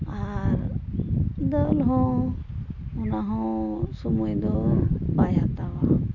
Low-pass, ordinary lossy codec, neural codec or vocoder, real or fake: 7.2 kHz; none; none; real